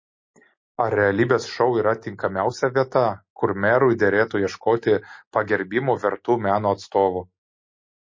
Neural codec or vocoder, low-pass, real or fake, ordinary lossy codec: none; 7.2 kHz; real; MP3, 32 kbps